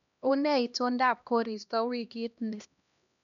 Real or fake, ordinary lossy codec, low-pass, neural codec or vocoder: fake; none; 7.2 kHz; codec, 16 kHz, 1 kbps, X-Codec, HuBERT features, trained on LibriSpeech